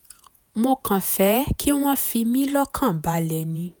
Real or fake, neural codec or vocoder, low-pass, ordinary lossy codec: fake; vocoder, 48 kHz, 128 mel bands, Vocos; none; none